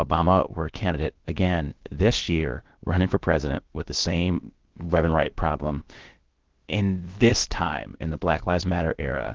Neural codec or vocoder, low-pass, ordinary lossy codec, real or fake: codec, 16 kHz, about 1 kbps, DyCAST, with the encoder's durations; 7.2 kHz; Opus, 16 kbps; fake